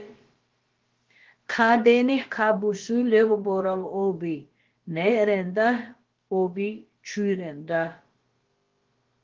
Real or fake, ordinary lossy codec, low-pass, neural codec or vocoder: fake; Opus, 16 kbps; 7.2 kHz; codec, 16 kHz, about 1 kbps, DyCAST, with the encoder's durations